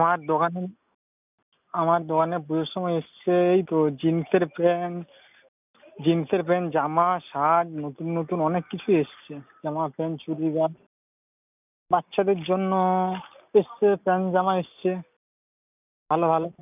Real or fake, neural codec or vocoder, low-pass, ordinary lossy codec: real; none; 3.6 kHz; none